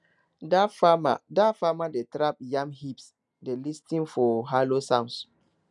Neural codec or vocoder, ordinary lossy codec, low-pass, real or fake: none; none; 10.8 kHz; real